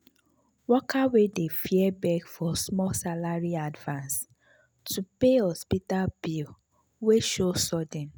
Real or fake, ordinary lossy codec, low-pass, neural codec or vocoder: real; none; none; none